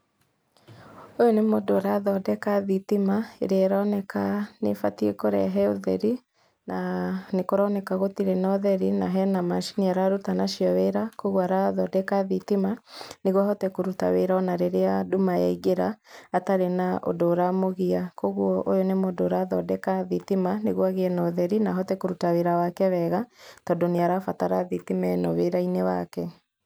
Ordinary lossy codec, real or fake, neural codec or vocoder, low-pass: none; real; none; none